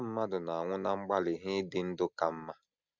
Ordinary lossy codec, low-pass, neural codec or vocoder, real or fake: none; 7.2 kHz; none; real